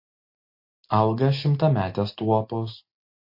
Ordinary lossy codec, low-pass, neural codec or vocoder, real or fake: MP3, 32 kbps; 5.4 kHz; none; real